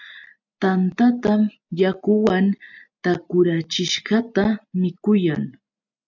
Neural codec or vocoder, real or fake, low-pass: none; real; 7.2 kHz